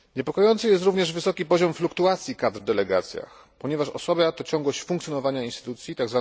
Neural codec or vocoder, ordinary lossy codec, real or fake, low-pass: none; none; real; none